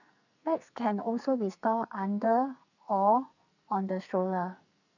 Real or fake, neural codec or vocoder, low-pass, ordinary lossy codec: fake; codec, 44.1 kHz, 2.6 kbps, SNAC; 7.2 kHz; none